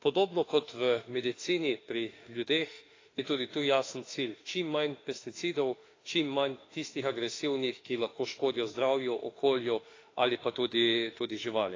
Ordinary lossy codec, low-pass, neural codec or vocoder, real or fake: AAC, 32 kbps; 7.2 kHz; autoencoder, 48 kHz, 32 numbers a frame, DAC-VAE, trained on Japanese speech; fake